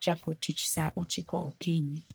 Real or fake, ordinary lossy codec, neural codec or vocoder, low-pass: fake; none; codec, 44.1 kHz, 1.7 kbps, Pupu-Codec; none